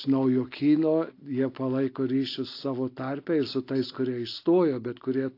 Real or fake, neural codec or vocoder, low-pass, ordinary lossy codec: real; none; 5.4 kHz; AAC, 32 kbps